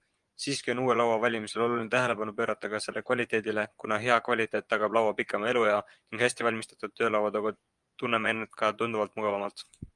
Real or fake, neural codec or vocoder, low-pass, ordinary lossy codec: fake; vocoder, 24 kHz, 100 mel bands, Vocos; 10.8 kHz; Opus, 32 kbps